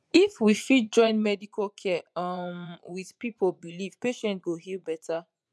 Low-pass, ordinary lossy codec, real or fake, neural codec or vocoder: none; none; fake; vocoder, 24 kHz, 100 mel bands, Vocos